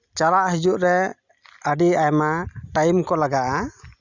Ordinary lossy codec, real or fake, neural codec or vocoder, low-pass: Opus, 64 kbps; real; none; 7.2 kHz